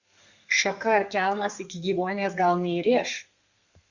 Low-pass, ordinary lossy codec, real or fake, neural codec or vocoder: 7.2 kHz; Opus, 64 kbps; fake; codec, 32 kHz, 1.9 kbps, SNAC